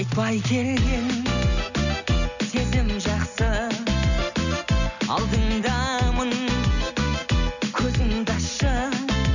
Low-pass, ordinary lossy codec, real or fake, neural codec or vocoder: 7.2 kHz; none; real; none